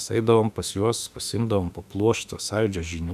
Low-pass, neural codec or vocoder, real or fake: 14.4 kHz; autoencoder, 48 kHz, 32 numbers a frame, DAC-VAE, trained on Japanese speech; fake